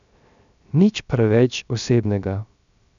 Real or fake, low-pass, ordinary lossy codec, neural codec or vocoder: fake; 7.2 kHz; none; codec, 16 kHz, 0.3 kbps, FocalCodec